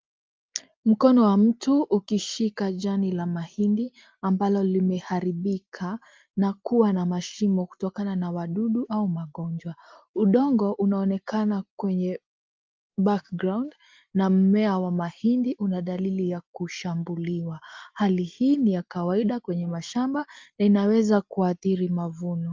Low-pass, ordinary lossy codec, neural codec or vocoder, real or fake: 7.2 kHz; Opus, 32 kbps; none; real